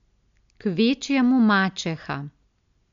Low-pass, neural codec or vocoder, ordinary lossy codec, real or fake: 7.2 kHz; none; MP3, 64 kbps; real